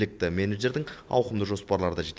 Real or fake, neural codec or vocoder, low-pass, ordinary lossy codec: real; none; none; none